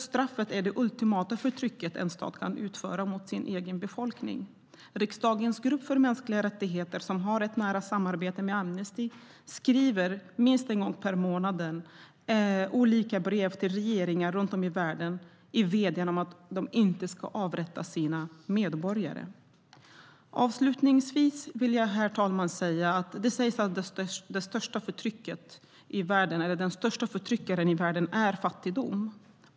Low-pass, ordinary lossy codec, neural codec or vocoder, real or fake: none; none; none; real